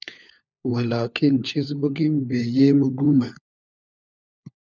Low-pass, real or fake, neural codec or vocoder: 7.2 kHz; fake; codec, 16 kHz, 4 kbps, FunCodec, trained on LibriTTS, 50 frames a second